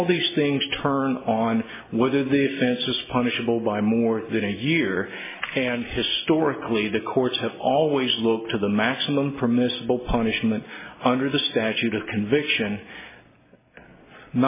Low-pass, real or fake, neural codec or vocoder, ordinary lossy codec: 3.6 kHz; real; none; MP3, 16 kbps